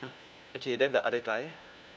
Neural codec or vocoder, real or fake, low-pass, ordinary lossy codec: codec, 16 kHz, 1 kbps, FunCodec, trained on LibriTTS, 50 frames a second; fake; none; none